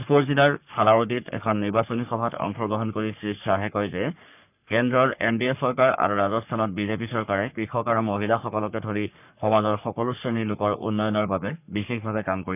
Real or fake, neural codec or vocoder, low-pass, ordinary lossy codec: fake; codec, 44.1 kHz, 3.4 kbps, Pupu-Codec; 3.6 kHz; none